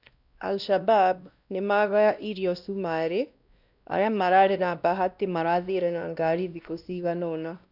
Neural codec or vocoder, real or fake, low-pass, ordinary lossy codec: codec, 16 kHz, 1 kbps, X-Codec, WavLM features, trained on Multilingual LibriSpeech; fake; 5.4 kHz; none